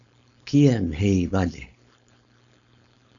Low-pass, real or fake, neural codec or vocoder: 7.2 kHz; fake; codec, 16 kHz, 4.8 kbps, FACodec